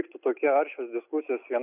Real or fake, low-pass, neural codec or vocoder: real; 3.6 kHz; none